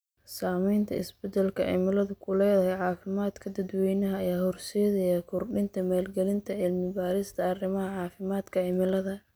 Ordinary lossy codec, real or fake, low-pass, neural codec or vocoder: none; real; none; none